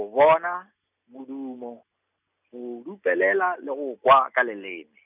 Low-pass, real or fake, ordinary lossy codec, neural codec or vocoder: 3.6 kHz; real; none; none